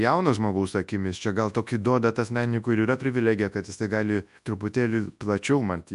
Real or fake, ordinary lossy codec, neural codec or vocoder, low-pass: fake; AAC, 96 kbps; codec, 24 kHz, 0.9 kbps, WavTokenizer, large speech release; 10.8 kHz